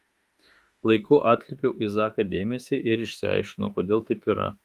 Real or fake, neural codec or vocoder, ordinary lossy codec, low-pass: fake; autoencoder, 48 kHz, 32 numbers a frame, DAC-VAE, trained on Japanese speech; Opus, 32 kbps; 14.4 kHz